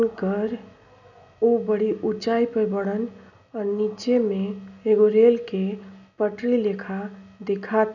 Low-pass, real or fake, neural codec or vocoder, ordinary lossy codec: 7.2 kHz; real; none; none